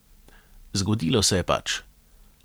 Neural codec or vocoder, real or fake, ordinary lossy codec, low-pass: none; real; none; none